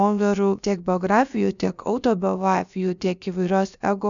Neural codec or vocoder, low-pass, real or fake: codec, 16 kHz, about 1 kbps, DyCAST, with the encoder's durations; 7.2 kHz; fake